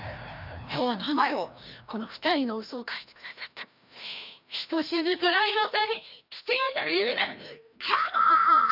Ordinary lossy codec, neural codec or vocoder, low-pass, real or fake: none; codec, 16 kHz, 1 kbps, FreqCodec, larger model; 5.4 kHz; fake